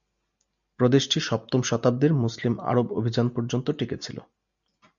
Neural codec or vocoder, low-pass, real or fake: none; 7.2 kHz; real